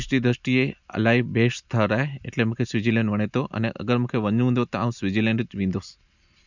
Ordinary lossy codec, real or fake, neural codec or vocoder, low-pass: none; real; none; 7.2 kHz